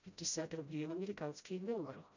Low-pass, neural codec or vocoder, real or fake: 7.2 kHz; codec, 16 kHz, 0.5 kbps, FreqCodec, smaller model; fake